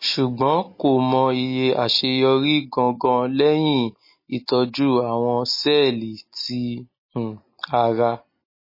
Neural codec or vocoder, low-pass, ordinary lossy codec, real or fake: none; 5.4 kHz; MP3, 24 kbps; real